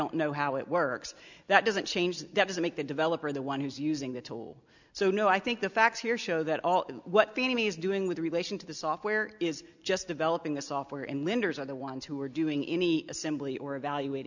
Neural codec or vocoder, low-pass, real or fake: none; 7.2 kHz; real